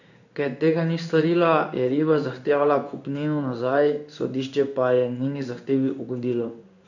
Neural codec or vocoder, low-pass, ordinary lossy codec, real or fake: codec, 16 kHz in and 24 kHz out, 1 kbps, XY-Tokenizer; 7.2 kHz; none; fake